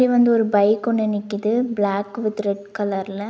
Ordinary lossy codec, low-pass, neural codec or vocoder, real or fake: none; none; none; real